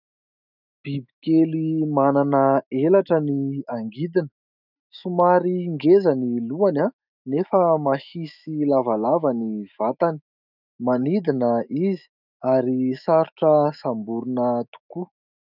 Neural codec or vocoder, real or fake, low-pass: autoencoder, 48 kHz, 128 numbers a frame, DAC-VAE, trained on Japanese speech; fake; 5.4 kHz